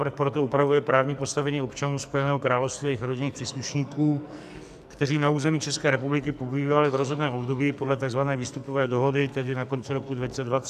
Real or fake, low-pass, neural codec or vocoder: fake; 14.4 kHz; codec, 44.1 kHz, 2.6 kbps, SNAC